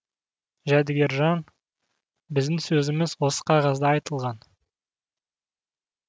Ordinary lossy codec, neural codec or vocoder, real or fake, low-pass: none; none; real; none